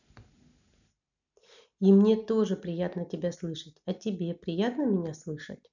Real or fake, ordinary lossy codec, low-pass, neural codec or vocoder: real; none; 7.2 kHz; none